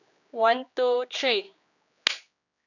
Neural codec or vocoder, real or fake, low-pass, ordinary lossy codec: codec, 16 kHz, 2 kbps, X-Codec, HuBERT features, trained on general audio; fake; 7.2 kHz; none